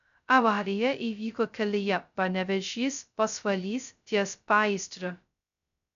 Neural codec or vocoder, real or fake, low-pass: codec, 16 kHz, 0.2 kbps, FocalCodec; fake; 7.2 kHz